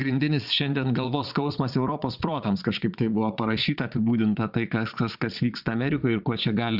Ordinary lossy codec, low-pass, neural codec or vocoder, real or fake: Opus, 64 kbps; 5.4 kHz; vocoder, 22.05 kHz, 80 mel bands, Vocos; fake